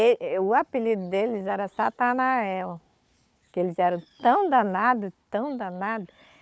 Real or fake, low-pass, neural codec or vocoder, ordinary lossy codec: fake; none; codec, 16 kHz, 4 kbps, FunCodec, trained on Chinese and English, 50 frames a second; none